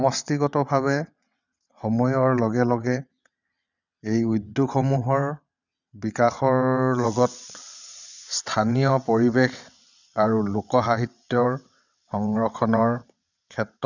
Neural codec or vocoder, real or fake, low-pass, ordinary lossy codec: vocoder, 22.05 kHz, 80 mel bands, WaveNeXt; fake; 7.2 kHz; none